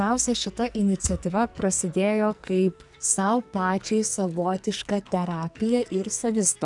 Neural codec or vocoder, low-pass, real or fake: codec, 44.1 kHz, 2.6 kbps, SNAC; 10.8 kHz; fake